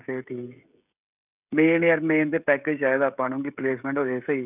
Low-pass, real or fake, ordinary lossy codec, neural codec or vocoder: 3.6 kHz; fake; none; codec, 16 kHz, 16 kbps, FreqCodec, smaller model